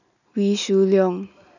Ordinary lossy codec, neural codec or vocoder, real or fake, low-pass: none; none; real; 7.2 kHz